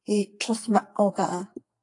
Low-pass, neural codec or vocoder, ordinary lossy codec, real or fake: 10.8 kHz; codec, 44.1 kHz, 2.6 kbps, SNAC; AAC, 48 kbps; fake